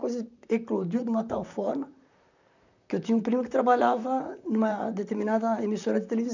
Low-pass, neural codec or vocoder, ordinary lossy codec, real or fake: 7.2 kHz; vocoder, 44.1 kHz, 128 mel bands, Pupu-Vocoder; none; fake